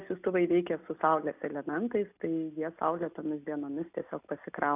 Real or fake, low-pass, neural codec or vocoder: real; 3.6 kHz; none